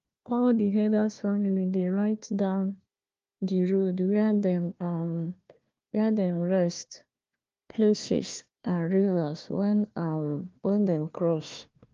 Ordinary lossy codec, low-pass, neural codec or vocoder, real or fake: Opus, 32 kbps; 7.2 kHz; codec, 16 kHz, 1 kbps, FunCodec, trained on Chinese and English, 50 frames a second; fake